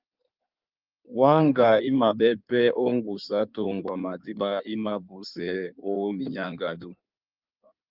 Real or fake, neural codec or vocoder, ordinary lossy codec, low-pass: fake; codec, 16 kHz in and 24 kHz out, 1.1 kbps, FireRedTTS-2 codec; Opus, 24 kbps; 5.4 kHz